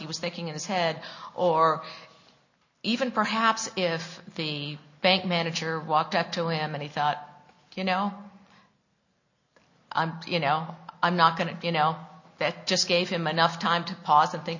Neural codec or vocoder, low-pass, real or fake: none; 7.2 kHz; real